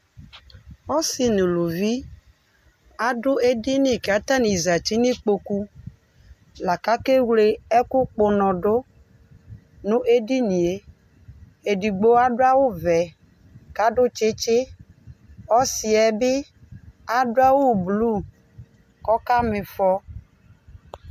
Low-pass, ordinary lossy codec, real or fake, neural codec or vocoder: 14.4 kHz; MP3, 96 kbps; real; none